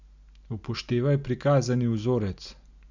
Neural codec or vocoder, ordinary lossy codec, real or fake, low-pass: none; none; real; 7.2 kHz